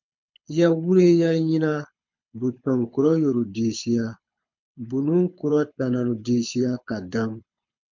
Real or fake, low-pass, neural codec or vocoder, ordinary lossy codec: fake; 7.2 kHz; codec, 24 kHz, 6 kbps, HILCodec; MP3, 48 kbps